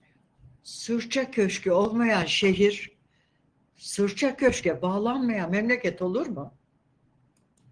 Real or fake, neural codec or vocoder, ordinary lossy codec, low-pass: real; none; Opus, 16 kbps; 9.9 kHz